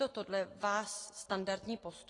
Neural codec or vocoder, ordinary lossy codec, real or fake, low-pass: none; AAC, 32 kbps; real; 10.8 kHz